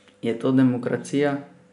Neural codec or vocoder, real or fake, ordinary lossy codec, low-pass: none; real; none; 10.8 kHz